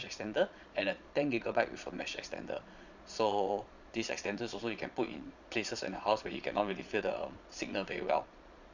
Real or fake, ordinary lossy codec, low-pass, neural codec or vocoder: fake; none; 7.2 kHz; vocoder, 22.05 kHz, 80 mel bands, Vocos